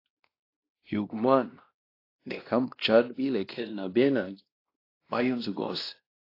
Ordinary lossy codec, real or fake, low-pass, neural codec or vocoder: AAC, 32 kbps; fake; 5.4 kHz; codec, 16 kHz, 1 kbps, X-Codec, WavLM features, trained on Multilingual LibriSpeech